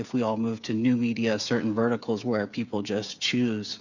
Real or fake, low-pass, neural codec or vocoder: fake; 7.2 kHz; codec, 16 kHz, 8 kbps, FreqCodec, smaller model